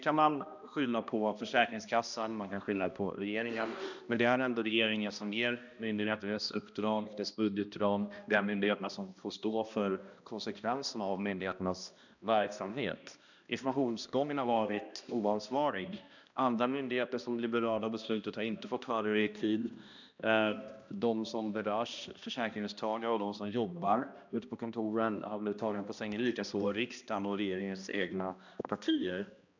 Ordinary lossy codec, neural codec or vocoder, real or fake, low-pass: none; codec, 16 kHz, 1 kbps, X-Codec, HuBERT features, trained on balanced general audio; fake; 7.2 kHz